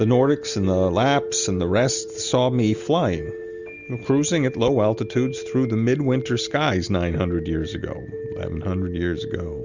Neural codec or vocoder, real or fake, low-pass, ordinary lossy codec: none; real; 7.2 kHz; Opus, 64 kbps